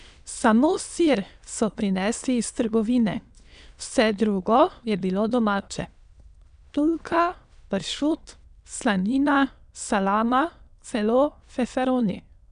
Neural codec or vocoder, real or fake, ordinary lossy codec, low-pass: autoencoder, 22.05 kHz, a latent of 192 numbers a frame, VITS, trained on many speakers; fake; MP3, 96 kbps; 9.9 kHz